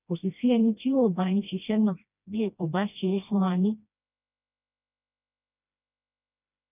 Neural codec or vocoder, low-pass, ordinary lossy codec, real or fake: codec, 16 kHz, 1 kbps, FreqCodec, smaller model; 3.6 kHz; none; fake